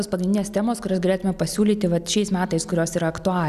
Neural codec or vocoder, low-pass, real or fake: none; 14.4 kHz; real